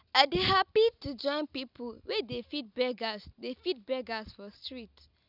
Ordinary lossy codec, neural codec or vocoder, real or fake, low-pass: none; none; real; 5.4 kHz